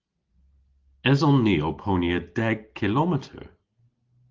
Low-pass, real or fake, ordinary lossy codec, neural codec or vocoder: 7.2 kHz; real; Opus, 16 kbps; none